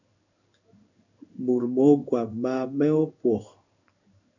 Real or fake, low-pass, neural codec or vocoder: fake; 7.2 kHz; codec, 16 kHz in and 24 kHz out, 1 kbps, XY-Tokenizer